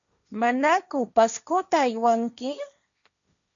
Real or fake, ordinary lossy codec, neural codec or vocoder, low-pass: fake; MP3, 96 kbps; codec, 16 kHz, 1.1 kbps, Voila-Tokenizer; 7.2 kHz